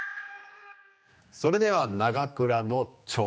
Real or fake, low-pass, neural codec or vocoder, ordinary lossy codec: fake; none; codec, 16 kHz, 2 kbps, X-Codec, HuBERT features, trained on general audio; none